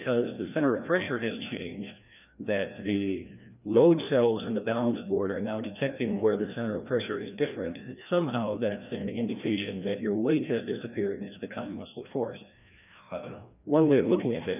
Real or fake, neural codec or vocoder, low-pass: fake; codec, 16 kHz, 1 kbps, FreqCodec, larger model; 3.6 kHz